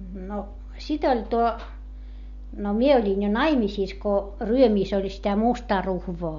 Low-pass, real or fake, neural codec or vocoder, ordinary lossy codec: 7.2 kHz; real; none; MP3, 48 kbps